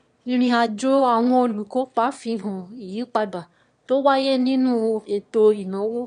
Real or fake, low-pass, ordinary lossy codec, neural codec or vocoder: fake; 9.9 kHz; MP3, 64 kbps; autoencoder, 22.05 kHz, a latent of 192 numbers a frame, VITS, trained on one speaker